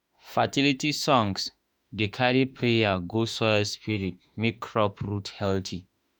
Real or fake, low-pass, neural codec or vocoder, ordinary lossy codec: fake; none; autoencoder, 48 kHz, 32 numbers a frame, DAC-VAE, trained on Japanese speech; none